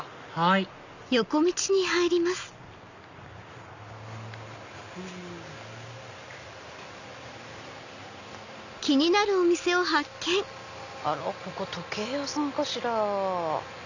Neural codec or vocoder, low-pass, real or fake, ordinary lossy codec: none; 7.2 kHz; real; none